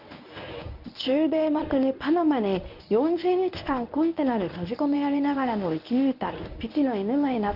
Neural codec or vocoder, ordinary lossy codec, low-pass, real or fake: codec, 24 kHz, 0.9 kbps, WavTokenizer, medium speech release version 1; none; 5.4 kHz; fake